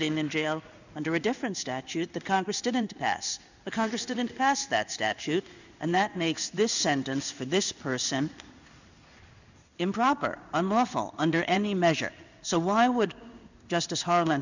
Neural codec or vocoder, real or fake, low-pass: codec, 16 kHz in and 24 kHz out, 1 kbps, XY-Tokenizer; fake; 7.2 kHz